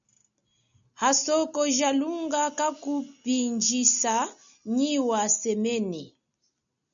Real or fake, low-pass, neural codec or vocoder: real; 7.2 kHz; none